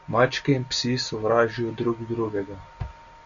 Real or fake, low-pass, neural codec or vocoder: real; 7.2 kHz; none